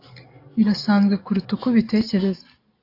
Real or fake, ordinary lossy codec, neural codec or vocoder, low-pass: real; AAC, 32 kbps; none; 5.4 kHz